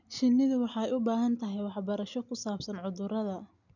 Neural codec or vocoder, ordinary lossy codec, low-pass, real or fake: none; none; 7.2 kHz; real